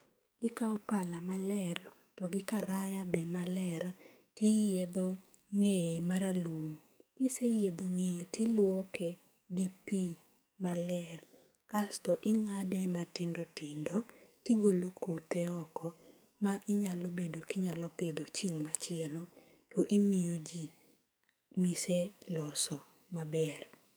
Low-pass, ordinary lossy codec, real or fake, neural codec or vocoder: none; none; fake; codec, 44.1 kHz, 2.6 kbps, SNAC